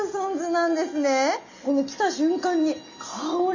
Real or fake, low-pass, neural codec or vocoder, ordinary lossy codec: real; 7.2 kHz; none; Opus, 64 kbps